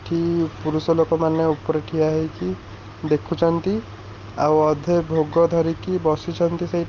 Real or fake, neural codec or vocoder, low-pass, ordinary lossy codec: real; none; 7.2 kHz; Opus, 32 kbps